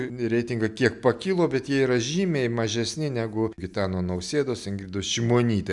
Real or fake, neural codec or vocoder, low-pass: real; none; 10.8 kHz